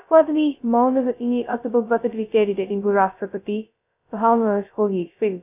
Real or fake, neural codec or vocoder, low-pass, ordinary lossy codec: fake; codec, 16 kHz, 0.2 kbps, FocalCodec; 3.6 kHz; none